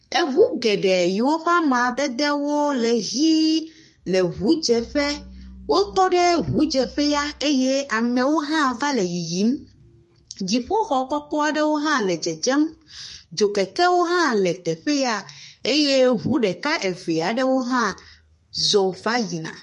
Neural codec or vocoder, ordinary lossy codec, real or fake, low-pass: codec, 32 kHz, 1.9 kbps, SNAC; MP3, 48 kbps; fake; 14.4 kHz